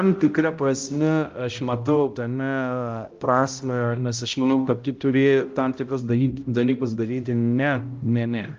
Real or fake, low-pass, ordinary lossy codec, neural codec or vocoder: fake; 7.2 kHz; Opus, 24 kbps; codec, 16 kHz, 0.5 kbps, X-Codec, HuBERT features, trained on balanced general audio